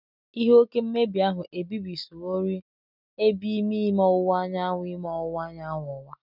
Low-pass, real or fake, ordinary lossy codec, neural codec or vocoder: 5.4 kHz; real; none; none